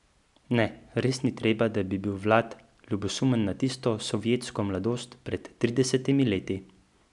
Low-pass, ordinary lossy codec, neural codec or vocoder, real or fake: 10.8 kHz; none; none; real